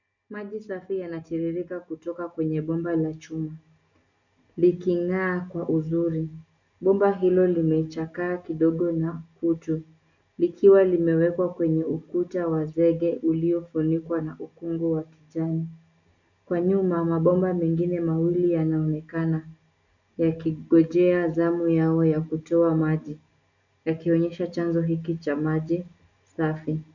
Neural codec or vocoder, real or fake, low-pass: none; real; 7.2 kHz